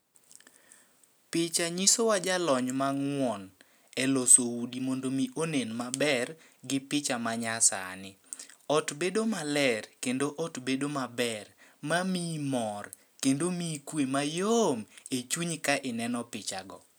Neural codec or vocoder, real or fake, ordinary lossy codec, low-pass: none; real; none; none